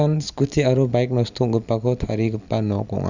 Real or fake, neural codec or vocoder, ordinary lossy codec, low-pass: real; none; none; 7.2 kHz